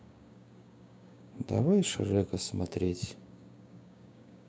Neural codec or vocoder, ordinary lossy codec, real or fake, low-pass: codec, 16 kHz, 6 kbps, DAC; none; fake; none